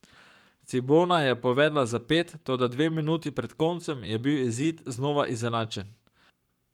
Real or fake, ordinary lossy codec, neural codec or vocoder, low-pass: fake; none; codec, 44.1 kHz, 7.8 kbps, Pupu-Codec; 19.8 kHz